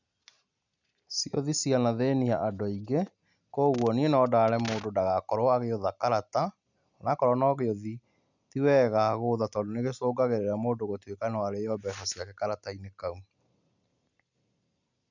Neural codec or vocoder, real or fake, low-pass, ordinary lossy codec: none; real; 7.2 kHz; none